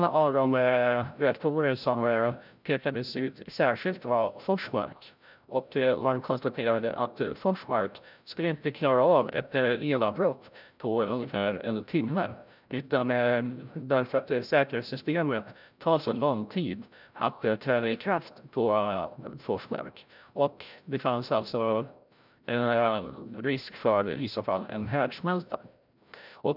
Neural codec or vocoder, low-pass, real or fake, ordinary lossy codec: codec, 16 kHz, 0.5 kbps, FreqCodec, larger model; 5.4 kHz; fake; none